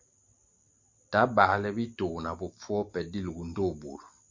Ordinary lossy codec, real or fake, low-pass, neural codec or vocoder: MP3, 48 kbps; real; 7.2 kHz; none